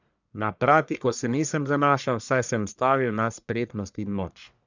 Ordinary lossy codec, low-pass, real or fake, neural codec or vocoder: none; 7.2 kHz; fake; codec, 44.1 kHz, 1.7 kbps, Pupu-Codec